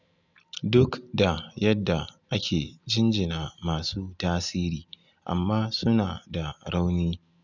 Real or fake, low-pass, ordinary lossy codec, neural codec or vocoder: real; 7.2 kHz; none; none